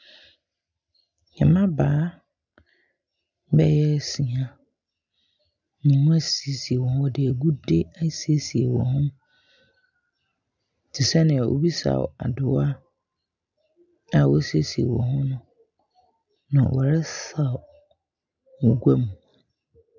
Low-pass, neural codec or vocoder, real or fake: 7.2 kHz; none; real